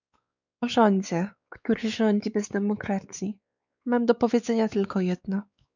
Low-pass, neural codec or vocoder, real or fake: 7.2 kHz; codec, 16 kHz, 4 kbps, X-Codec, WavLM features, trained on Multilingual LibriSpeech; fake